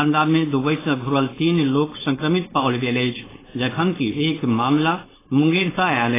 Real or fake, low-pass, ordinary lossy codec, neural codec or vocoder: fake; 3.6 kHz; AAC, 16 kbps; codec, 16 kHz, 4.8 kbps, FACodec